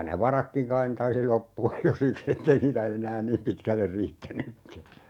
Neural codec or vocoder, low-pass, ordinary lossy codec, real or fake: codec, 44.1 kHz, 7.8 kbps, DAC; 19.8 kHz; none; fake